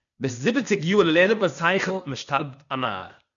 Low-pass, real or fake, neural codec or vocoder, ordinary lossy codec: 7.2 kHz; fake; codec, 16 kHz, 0.8 kbps, ZipCodec; MP3, 96 kbps